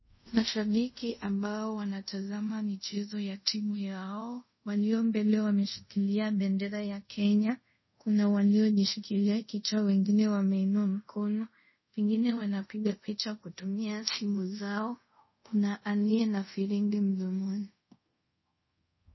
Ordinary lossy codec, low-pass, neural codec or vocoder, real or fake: MP3, 24 kbps; 7.2 kHz; codec, 24 kHz, 0.5 kbps, DualCodec; fake